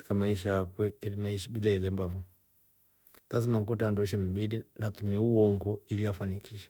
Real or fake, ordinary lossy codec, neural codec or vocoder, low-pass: fake; none; autoencoder, 48 kHz, 32 numbers a frame, DAC-VAE, trained on Japanese speech; none